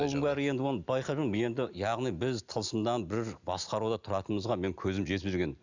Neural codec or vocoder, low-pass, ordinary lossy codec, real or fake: none; 7.2 kHz; Opus, 64 kbps; real